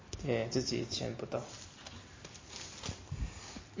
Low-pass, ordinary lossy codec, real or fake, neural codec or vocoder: 7.2 kHz; MP3, 32 kbps; real; none